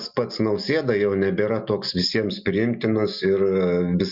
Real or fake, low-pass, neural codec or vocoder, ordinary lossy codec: real; 5.4 kHz; none; Opus, 64 kbps